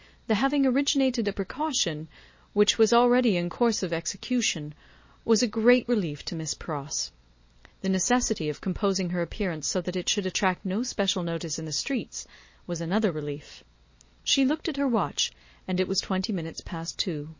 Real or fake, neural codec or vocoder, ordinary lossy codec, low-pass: real; none; MP3, 32 kbps; 7.2 kHz